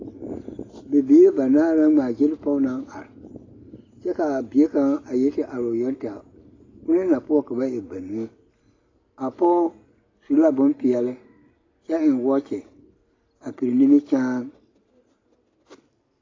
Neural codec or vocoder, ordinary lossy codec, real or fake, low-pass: none; AAC, 32 kbps; real; 7.2 kHz